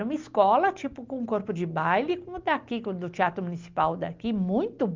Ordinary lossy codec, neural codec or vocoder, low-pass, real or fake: Opus, 32 kbps; none; 7.2 kHz; real